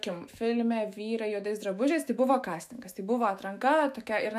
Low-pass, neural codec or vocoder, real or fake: 14.4 kHz; autoencoder, 48 kHz, 128 numbers a frame, DAC-VAE, trained on Japanese speech; fake